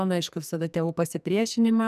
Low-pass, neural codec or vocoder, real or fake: 14.4 kHz; codec, 44.1 kHz, 2.6 kbps, SNAC; fake